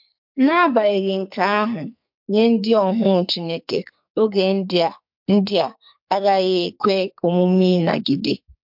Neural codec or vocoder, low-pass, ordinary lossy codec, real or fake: codec, 44.1 kHz, 2.6 kbps, SNAC; 5.4 kHz; MP3, 48 kbps; fake